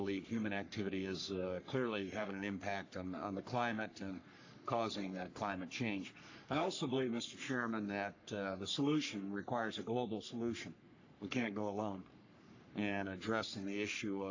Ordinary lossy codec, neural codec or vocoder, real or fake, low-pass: AAC, 48 kbps; codec, 44.1 kHz, 3.4 kbps, Pupu-Codec; fake; 7.2 kHz